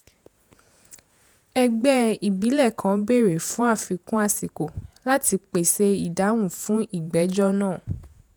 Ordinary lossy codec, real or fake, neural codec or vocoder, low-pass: none; fake; vocoder, 48 kHz, 128 mel bands, Vocos; none